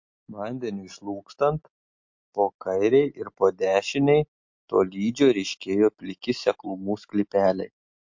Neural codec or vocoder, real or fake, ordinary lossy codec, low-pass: none; real; MP3, 48 kbps; 7.2 kHz